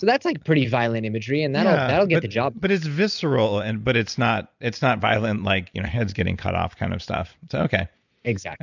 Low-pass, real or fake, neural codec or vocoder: 7.2 kHz; real; none